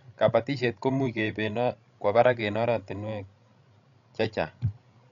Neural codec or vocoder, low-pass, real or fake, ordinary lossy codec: codec, 16 kHz, 16 kbps, FreqCodec, larger model; 7.2 kHz; fake; none